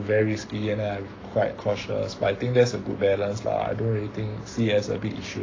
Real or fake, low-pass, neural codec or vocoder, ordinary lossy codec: fake; 7.2 kHz; codec, 44.1 kHz, 7.8 kbps, Pupu-Codec; AAC, 32 kbps